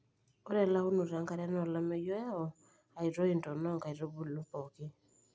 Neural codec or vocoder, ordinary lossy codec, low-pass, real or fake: none; none; none; real